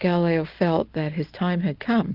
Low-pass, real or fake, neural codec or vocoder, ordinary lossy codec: 5.4 kHz; real; none; Opus, 24 kbps